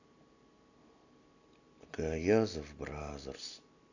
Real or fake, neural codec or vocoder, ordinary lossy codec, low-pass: real; none; none; 7.2 kHz